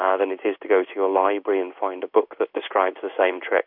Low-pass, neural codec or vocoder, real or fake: 5.4 kHz; codec, 16 kHz in and 24 kHz out, 1 kbps, XY-Tokenizer; fake